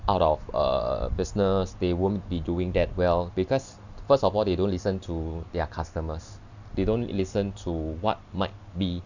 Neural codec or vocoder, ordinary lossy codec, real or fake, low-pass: none; none; real; 7.2 kHz